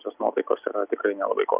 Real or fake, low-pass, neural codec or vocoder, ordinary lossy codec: real; 3.6 kHz; none; Opus, 64 kbps